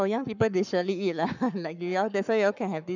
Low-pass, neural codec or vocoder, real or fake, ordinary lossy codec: 7.2 kHz; codec, 44.1 kHz, 7.8 kbps, Pupu-Codec; fake; none